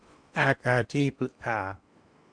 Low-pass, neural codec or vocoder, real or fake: 9.9 kHz; codec, 16 kHz in and 24 kHz out, 0.8 kbps, FocalCodec, streaming, 65536 codes; fake